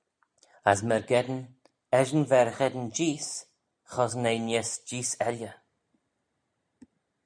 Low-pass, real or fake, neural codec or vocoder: 9.9 kHz; real; none